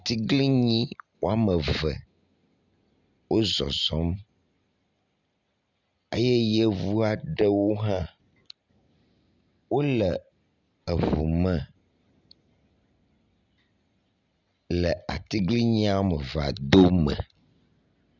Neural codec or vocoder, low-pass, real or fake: none; 7.2 kHz; real